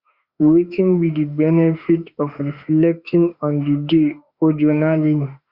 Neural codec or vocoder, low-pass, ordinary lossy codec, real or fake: autoencoder, 48 kHz, 32 numbers a frame, DAC-VAE, trained on Japanese speech; 5.4 kHz; Opus, 64 kbps; fake